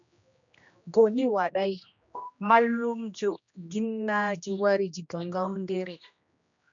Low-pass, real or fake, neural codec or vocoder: 7.2 kHz; fake; codec, 16 kHz, 1 kbps, X-Codec, HuBERT features, trained on general audio